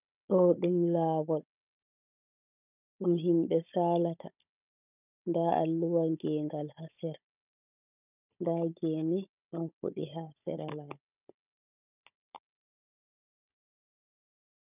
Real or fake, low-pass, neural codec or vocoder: fake; 3.6 kHz; codec, 16 kHz, 16 kbps, FunCodec, trained on Chinese and English, 50 frames a second